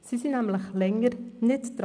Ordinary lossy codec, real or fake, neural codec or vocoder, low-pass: none; real; none; 9.9 kHz